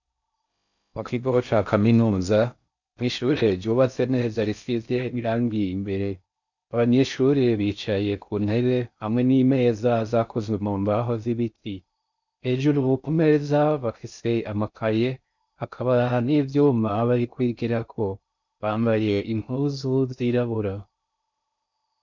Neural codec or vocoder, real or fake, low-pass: codec, 16 kHz in and 24 kHz out, 0.6 kbps, FocalCodec, streaming, 4096 codes; fake; 7.2 kHz